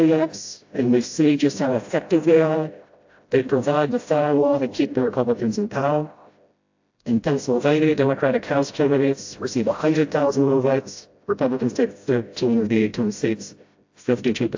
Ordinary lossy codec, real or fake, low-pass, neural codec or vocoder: AAC, 48 kbps; fake; 7.2 kHz; codec, 16 kHz, 0.5 kbps, FreqCodec, smaller model